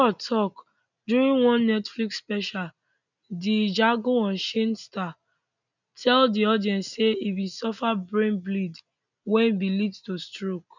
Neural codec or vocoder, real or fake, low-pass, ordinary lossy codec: none; real; 7.2 kHz; none